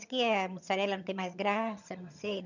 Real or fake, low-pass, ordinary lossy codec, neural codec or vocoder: fake; 7.2 kHz; none; vocoder, 22.05 kHz, 80 mel bands, HiFi-GAN